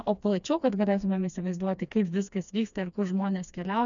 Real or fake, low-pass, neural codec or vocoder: fake; 7.2 kHz; codec, 16 kHz, 2 kbps, FreqCodec, smaller model